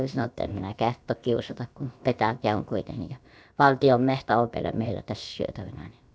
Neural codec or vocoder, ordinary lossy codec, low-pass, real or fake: codec, 16 kHz, about 1 kbps, DyCAST, with the encoder's durations; none; none; fake